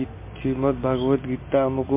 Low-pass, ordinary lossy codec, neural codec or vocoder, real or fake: 3.6 kHz; MP3, 24 kbps; none; real